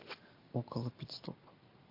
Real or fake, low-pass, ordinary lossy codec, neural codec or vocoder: real; 5.4 kHz; MP3, 32 kbps; none